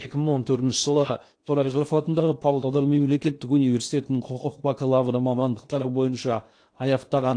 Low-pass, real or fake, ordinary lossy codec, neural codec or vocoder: 9.9 kHz; fake; AAC, 48 kbps; codec, 16 kHz in and 24 kHz out, 0.8 kbps, FocalCodec, streaming, 65536 codes